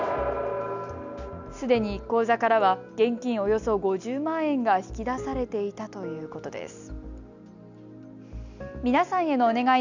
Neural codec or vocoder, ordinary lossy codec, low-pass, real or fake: none; none; 7.2 kHz; real